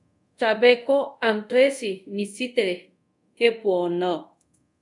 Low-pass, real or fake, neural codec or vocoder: 10.8 kHz; fake; codec, 24 kHz, 0.5 kbps, DualCodec